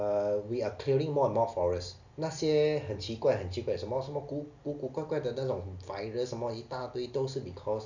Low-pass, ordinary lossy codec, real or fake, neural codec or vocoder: 7.2 kHz; none; real; none